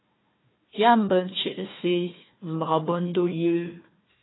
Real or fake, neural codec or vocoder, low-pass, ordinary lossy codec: fake; codec, 16 kHz, 1 kbps, FunCodec, trained on Chinese and English, 50 frames a second; 7.2 kHz; AAC, 16 kbps